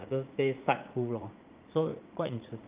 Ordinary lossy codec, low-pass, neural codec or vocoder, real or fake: Opus, 24 kbps; 3.6 kHz; vocoder, 44.1 kHz, 80 mel bands, Vocos; fake